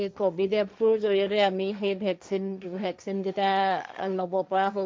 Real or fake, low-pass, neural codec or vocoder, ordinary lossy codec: fake; none; codec, 16 kHz, 1.1 kbps, Voila-Tokenizer; none